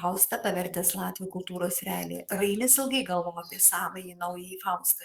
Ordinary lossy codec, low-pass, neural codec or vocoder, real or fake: Opus, 64 kbps; 19.8 kHz; codec, 44.1 kHz, 7.8 kbps, DAC; fake